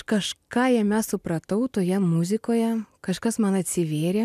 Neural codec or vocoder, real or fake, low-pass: none; real; 14.4 kHz